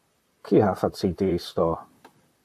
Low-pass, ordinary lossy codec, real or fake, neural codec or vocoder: 14.4 kHz; AAC, 96 kbps; fake; vocoder, 44.1 kHz, 128 mel bands, Pupu-Vocoder